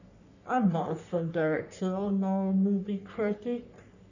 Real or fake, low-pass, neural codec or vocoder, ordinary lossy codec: fake; 7.2 kHz; codec, 44.1 kHz, 3.4 kbps, Pupu-Codec; none